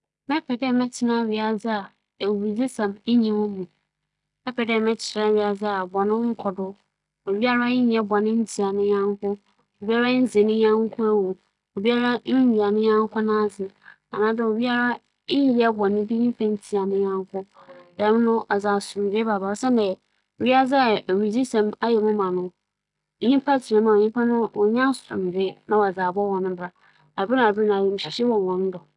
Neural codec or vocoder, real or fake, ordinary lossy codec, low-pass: none; real; none; 10.8 kHz